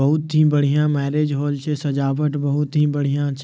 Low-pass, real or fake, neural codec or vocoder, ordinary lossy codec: none; real; none; none